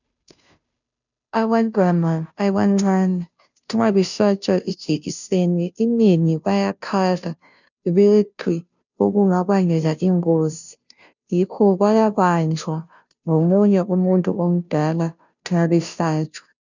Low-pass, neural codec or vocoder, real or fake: 7.2 kHz; codec, 16 kHz, 0.5 kbps, FunCodec, trained on Chinese and English, 25 frames a second; fake